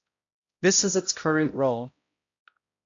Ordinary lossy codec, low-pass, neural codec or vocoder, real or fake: AAC, 48 kbps; 7.2 kHz; codec, 16 kHz, 0.5 kbps, X-Codec, HuBERT features, trained on balanced general audio; fake